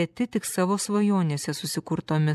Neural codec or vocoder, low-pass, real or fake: vocoder, 44.1 kHz, 128 mel bands every 256 samples, BigVGAN v2; 14.4 kHz; fake